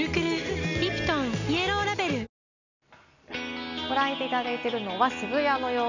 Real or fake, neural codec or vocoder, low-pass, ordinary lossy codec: real; none; 7.2 kHz; none